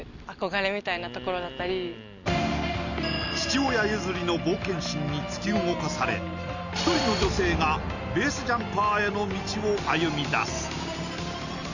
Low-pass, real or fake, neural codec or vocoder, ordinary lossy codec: 7.2 kHz; real; none; none